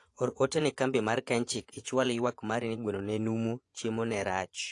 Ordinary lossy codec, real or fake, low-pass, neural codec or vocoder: AAC, 48 kbps; fake; 10.8 kHz; vocoder, 24 kHz, 100 mel bands, Vocos